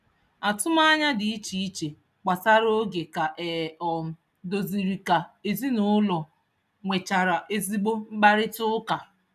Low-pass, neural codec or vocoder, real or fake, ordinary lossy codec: 14.4 kHz; none; real; none